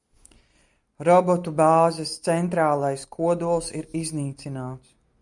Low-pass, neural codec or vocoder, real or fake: 10.8 kHz; none; real